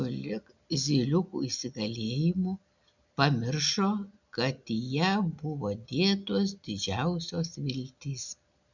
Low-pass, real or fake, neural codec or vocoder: 7.2 kHz; real; none